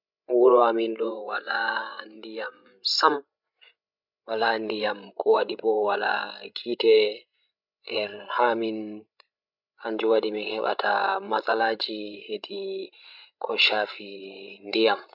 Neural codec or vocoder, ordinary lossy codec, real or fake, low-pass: codec, 16 kHz, 16 kbps, FreqCodec, larger model; none; fake; 5.4 kHz